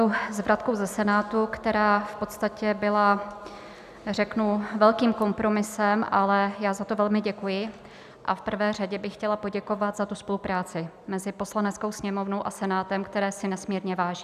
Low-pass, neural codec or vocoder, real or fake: 14.4 kHz; none; real